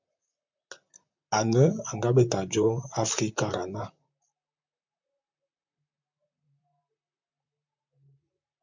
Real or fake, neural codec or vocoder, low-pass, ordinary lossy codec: fake; vocoder, 44.1 kHz, 128 mel bands, Pupu-Vocoder; 7.2 kHz; MP3, 64 kbps